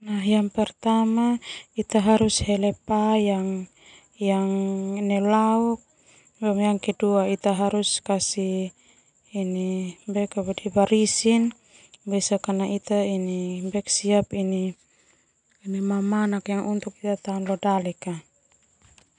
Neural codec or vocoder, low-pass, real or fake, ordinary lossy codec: none; 9.9 kHz; real; none